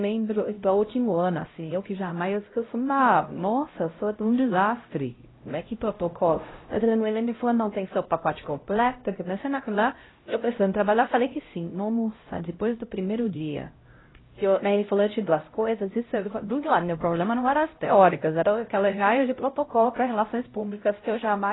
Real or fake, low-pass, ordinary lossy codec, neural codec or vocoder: fake; 7.2 kHz; AAC, 16 kbps; codec, 16 kHz, 0.5 kbps, X-Codec, HuBERT features, trained on LibriSpeech